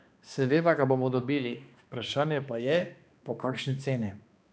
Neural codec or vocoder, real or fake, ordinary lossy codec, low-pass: codec, 16 kHz, 2 kbps, X-Codec, HuBERT features, trained on balanced general audio; fake; none; none